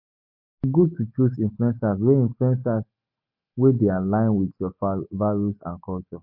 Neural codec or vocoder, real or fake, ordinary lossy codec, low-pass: none; real; MP3, 48 kbps; 5.4 kHz